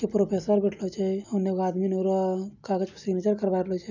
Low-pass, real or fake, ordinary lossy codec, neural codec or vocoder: 7.2 kHz; real; none; none